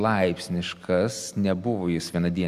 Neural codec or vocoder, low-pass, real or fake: none; 14.4 kHz; real